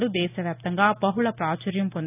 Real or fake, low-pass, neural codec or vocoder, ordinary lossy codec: real; 3.6 kHz; none; AAC, 24 kbps